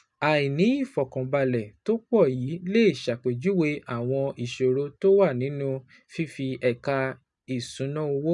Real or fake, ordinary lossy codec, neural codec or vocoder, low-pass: real; none; none; 10.8 kHz